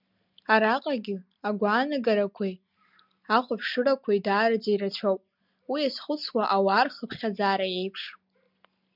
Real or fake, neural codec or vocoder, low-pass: real; none; 5.4 kHz